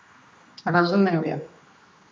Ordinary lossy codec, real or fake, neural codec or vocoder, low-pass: none; fake; codec, 16 kHz, 2 kbps, X-Codec, HuBERT features, trained on general audio; none